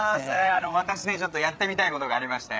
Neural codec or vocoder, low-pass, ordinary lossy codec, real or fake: codec, 16 kHz, 8 kbps, FreqCodec, larger model; none; none; fake